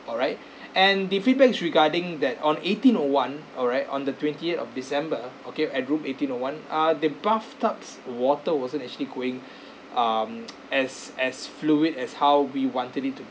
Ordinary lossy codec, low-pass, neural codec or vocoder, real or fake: none; none; none; real